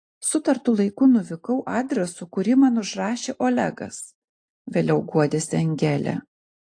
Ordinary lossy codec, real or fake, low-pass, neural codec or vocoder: AAC, 48 kbps; real; 9.9 kHz; none